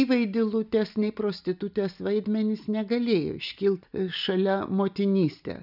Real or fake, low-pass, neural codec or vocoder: real; 5.4 kHz; none